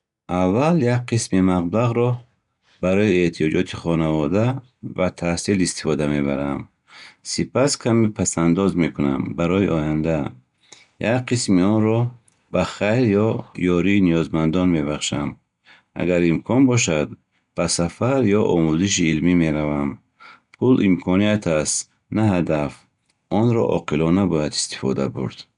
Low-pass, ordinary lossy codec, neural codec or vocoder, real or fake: 10.8 kHz; none; none; real